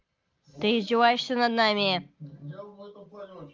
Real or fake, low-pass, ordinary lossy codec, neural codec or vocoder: real; 7.2 kHz; Opus, 24 kbps; none